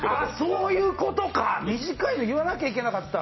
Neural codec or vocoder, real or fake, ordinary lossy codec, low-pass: none; real; MP3, 24 kbps; 7.2 kHz